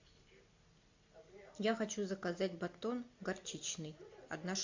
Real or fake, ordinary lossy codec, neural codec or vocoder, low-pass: real; AAC, 48 kbps; none; 7.2 kHz